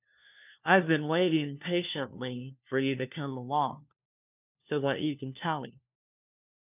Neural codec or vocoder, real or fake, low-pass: codec, 16 kHz, 1 kbps, FunCodec, trained on LibriTTS, 50 frames a second; fake; 3.6 kHz